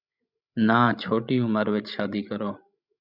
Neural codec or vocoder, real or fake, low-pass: codec, 16 kHz, 16 kbps, FreqCodec, larger model; fake; 5.4 kHz